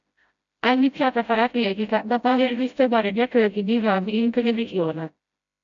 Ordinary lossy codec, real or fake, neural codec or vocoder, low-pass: AAC, 64 kbps; fake; codec, 16 kHz, 0.5 kbps, FreqCodec, smaller model; 7.2 kHz